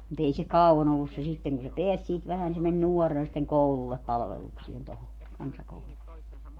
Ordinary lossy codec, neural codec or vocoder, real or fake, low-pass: Opus, 64 kbps; codec, 44.1 kHz, 7.8 kbps, Pupu-Codec; fake; 19.8 kHz